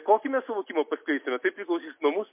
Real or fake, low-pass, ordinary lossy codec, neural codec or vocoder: real; 3.6 kHz; MP3, 24 kbps; none